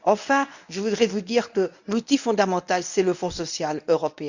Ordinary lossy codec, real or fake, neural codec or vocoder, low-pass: none; fake; codec, 24 kHz, 0.9 kbps, WavTokenizer, medium speech release version 1; 7.2 kHz